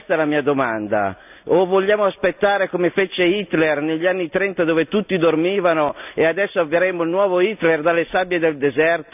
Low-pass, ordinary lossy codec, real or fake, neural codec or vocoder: 3.6 kHz; none; real; none